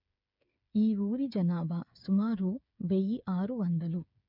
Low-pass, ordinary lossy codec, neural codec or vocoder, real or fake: 5.4 kHz; none; codec, 16 kHz, 8 kbps, FreqCodec, smaller model; fake